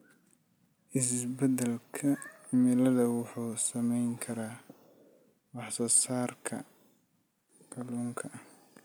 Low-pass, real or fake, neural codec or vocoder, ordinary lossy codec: none; real; none; none